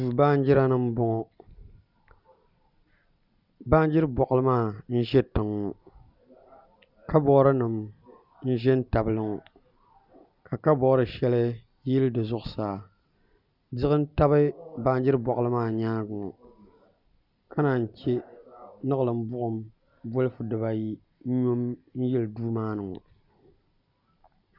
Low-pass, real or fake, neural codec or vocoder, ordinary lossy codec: 5.4 kHz; real; none; Opus, 64 kbps